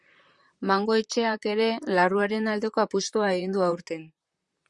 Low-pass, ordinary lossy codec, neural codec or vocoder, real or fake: 10.8 kHz; Opus, 64 kbps; vocoder, 44.1 kHz, 128 mel bands, Pupu-Vocoder; fake